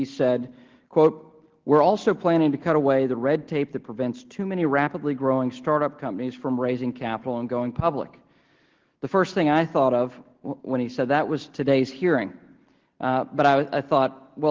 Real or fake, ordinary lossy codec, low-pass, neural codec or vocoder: real; Opus, 16 kbps; 7.2 kHz; none